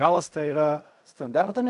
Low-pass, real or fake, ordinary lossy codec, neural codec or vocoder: 10.8 kHz; fake; AAC, 64 kbps; codec, 16 kHz in and 24 kHz out, 0.4 kbps, LongCat-Audio-Codec, fine tuned four codebook decoder